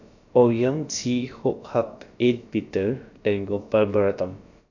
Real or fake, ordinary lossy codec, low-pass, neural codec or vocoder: fake; none; 7.2 kHz; codec, 16 kHz, about 1 kbps, DyCAST, with the encoder's durations